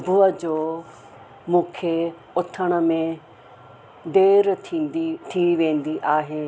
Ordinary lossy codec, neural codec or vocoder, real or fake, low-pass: none; none; real; none